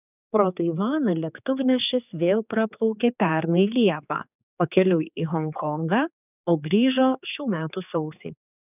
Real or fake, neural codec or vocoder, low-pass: fake; codec, 16 kHz, 4 kbps, X-Codec, HuBERT features, trained on general audio; 3.6 kHz